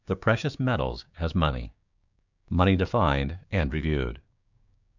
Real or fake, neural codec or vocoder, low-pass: fake; codec, 16 kHz, 6 kbps, DAC; 7.2 kHz